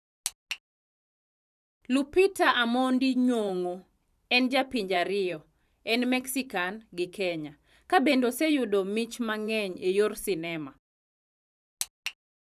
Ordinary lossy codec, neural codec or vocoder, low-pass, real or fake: none; vocoder, 44.1 kHz, 128 mel bands every 512 samples, BigVGAN v2; 14.4 kHz; fake